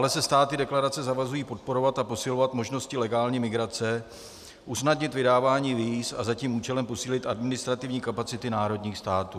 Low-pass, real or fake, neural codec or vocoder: 14.4 kHz; real; none